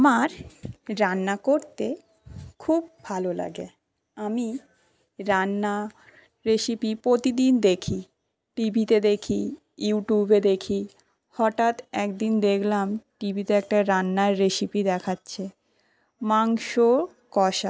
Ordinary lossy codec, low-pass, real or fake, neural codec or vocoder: none; none; real; none